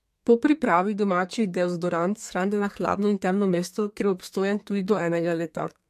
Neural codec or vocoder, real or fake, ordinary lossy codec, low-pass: codec, 32 kHz, 1.9 kbps, SNAC; fake; MP3, 64 kbps; 14.4 kHz